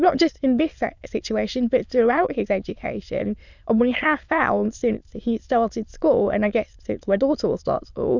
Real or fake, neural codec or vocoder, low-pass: fake; autoencoder, 22.05 kHz, a latent of 192 numbers a frame, VITS, trained on many speakers; 7.2 kHz